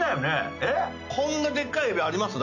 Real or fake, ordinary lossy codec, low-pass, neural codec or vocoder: real; none; 7.2 kHz; none